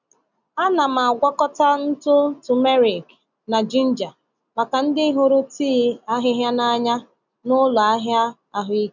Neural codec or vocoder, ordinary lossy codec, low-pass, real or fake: none; none; 7.2 kHz; real